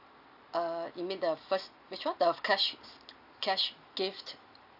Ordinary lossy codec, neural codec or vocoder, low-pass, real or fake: AAC, 48 kbps; none; 5.4 kHz; real